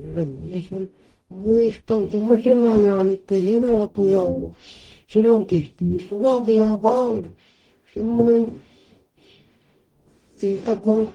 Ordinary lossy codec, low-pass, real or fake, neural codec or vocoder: Opus, 24 kbps; 19.8 kHz; fake; codec, 44.1 kHz, 0.9 kbps, DAC